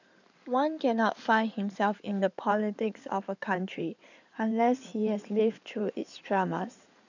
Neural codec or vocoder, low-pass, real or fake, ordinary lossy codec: codec, 16 kHz in and 24 kHz out, 2.2 kbps, FireRedTTS-2 codec; 7.2 kHz; fake; none